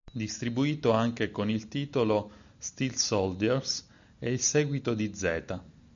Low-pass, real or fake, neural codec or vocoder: 7.2 kHz; real; none